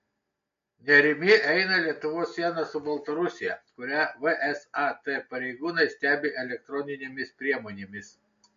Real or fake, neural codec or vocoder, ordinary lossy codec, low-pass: real; none; MP3, 48 kbps; 7.2 kHz